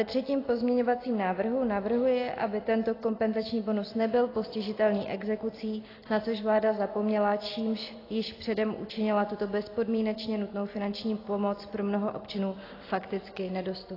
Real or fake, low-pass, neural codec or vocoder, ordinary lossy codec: real; 5.4 kHz; none; AAC, 24 kbps